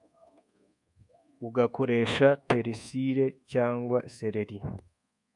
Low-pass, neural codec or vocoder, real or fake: 10.8 kHz; codec, 24 kHz, 1.2 kbps, DualCodec; fake